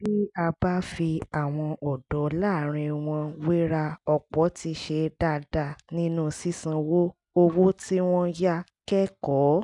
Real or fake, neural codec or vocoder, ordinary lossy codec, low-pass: real; none; none; 10.8 kHz